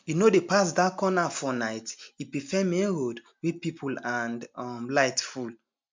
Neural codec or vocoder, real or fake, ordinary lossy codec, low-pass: none; real; MP3, 64 kbps; 7.2 kHz